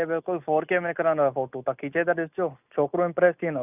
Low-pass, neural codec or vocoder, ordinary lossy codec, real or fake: 3.6 kHz; none; none; real